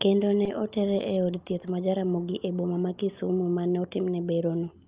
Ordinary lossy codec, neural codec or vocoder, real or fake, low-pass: Opus, 24 kbps; none; real; 3.6 kHz